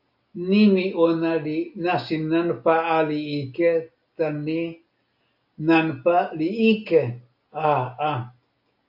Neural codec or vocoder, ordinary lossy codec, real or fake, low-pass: none; AAC, 48 kbps; real; 5.4 kHz